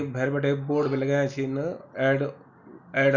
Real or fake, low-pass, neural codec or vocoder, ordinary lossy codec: real; 7.2 kHz; none; none